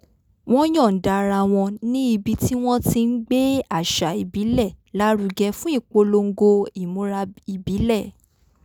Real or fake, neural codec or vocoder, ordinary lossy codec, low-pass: real; none; none; none